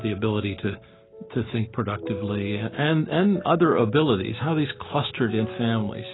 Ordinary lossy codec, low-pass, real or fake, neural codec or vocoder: AAC, 16 kbps; 7.2 kHz; real; none